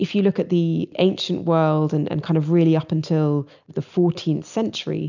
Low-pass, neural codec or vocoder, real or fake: 7.2 kHz; none; real